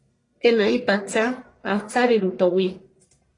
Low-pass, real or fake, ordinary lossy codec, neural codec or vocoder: 10.8 kHz; fake; AAC, 32 kbps; codec, 44.1 kHz, 1.7 kbps, Pupu-Codec